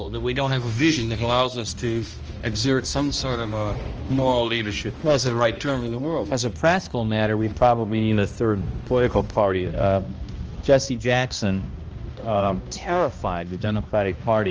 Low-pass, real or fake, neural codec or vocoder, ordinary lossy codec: 7.2 kHz; fake; codec, 16 kHz, 1 kbps, X-Codec, HuBERT features, trained on balanced general audio; Opus, 24 kbps